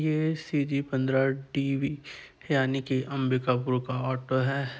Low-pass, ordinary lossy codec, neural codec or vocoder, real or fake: none; none; none; real